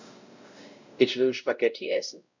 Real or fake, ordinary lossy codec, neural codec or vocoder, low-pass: fake; none; codec, 16 kHz, 0.5 kbps, X-Codec, WavLM features, trained on Multilingual LibriSpeech; 7.2 kHz